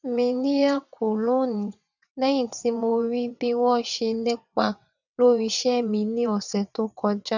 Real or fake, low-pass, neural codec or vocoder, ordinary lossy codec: fake; 7.2 kHz; vocoder, 22.05 kHz, 80 mel bands, WaveNeXt; none